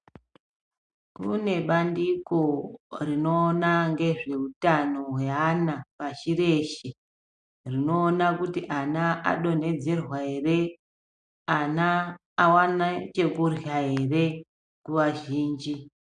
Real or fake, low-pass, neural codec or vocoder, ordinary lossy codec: real; 9.9 kHz; none; MP3, 96 kbps